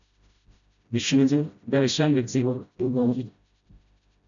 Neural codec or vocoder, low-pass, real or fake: codec, 16 kHz, 0.5 kbps, FreqCodec, smaller model; 7.2 kHz; fake